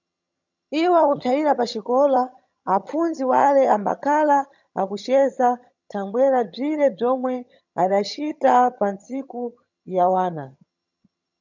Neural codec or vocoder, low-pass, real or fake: vocoder, 22.05 kHz, 80 mel bands, HiFi-GAN; 7.2 kHz; fake